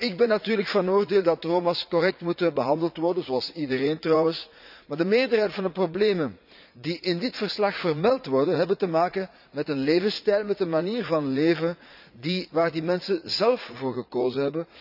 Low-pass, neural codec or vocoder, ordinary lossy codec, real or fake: 5.4 kHz; vocoder, 44.1 kHz, 80 mel bands, Vocos; none; fake